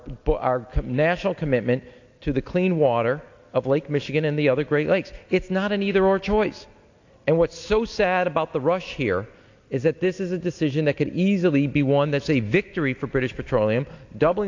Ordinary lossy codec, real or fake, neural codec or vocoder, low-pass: AAC, 48 kbps; real; none; 7.2 kHz